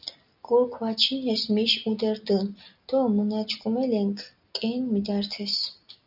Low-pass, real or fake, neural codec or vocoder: 5.4 kHz; real; none